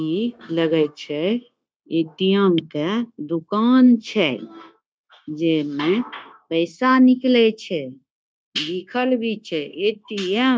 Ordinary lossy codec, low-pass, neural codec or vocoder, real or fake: none; none; codec, 16 kHz, 0.9 kbps, LongCat-Audio-Codec; fake